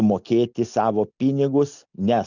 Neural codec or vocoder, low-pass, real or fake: none; 7.2 kHz; real